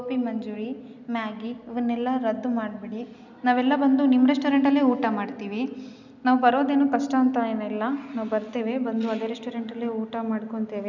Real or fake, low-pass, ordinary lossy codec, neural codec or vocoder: real; 7.2 kHz; none; none